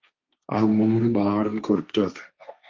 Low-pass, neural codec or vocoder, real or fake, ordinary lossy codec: 7.2 kHz; codec, 16 kHz, 1.1 kbps, Voila-Tokenizer; fake; Opus, 24 kbps